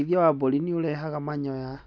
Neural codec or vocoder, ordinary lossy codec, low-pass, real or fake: none; none; none; real